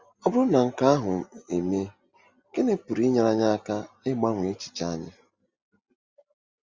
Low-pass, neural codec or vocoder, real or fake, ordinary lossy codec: 7.2 kHz; none; real; Opus, 32 kbps